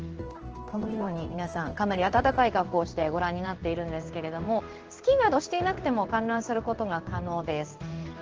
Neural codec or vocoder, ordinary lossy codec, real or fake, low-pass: codec, 16 kHz in and 24 kHz out, 1 kbps, XY-Tokenizer; Opus, 16 kbps; fake; 7.2 kHz